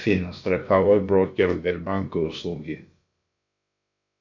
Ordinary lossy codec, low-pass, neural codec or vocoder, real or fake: MP3, 48 kbps; 7.2 kHz; codec, 16 kHz, about 1 kbps, DyCAST, with the encoder's durations; fake